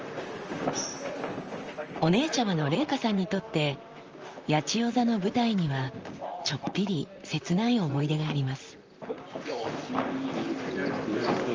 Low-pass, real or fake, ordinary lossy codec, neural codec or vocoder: 7.2 kHz; fake; Opus, 24 kbps; vocoder, 44.1 kHz, 128 mel bands, Pupu-Vocoder